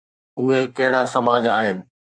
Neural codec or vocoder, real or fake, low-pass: codec, 24 kHz, 1 kbps, SNAC; fake; 9.9 kHz